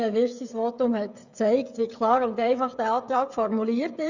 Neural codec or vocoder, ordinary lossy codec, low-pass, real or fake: codec, 16 kHz, 16 kbps, FreqCodec, smaller model; none; 7.2 kHz; fake